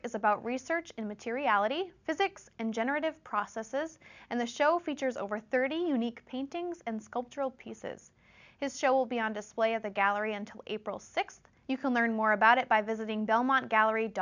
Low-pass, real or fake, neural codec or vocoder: 7.2 kHz; real; none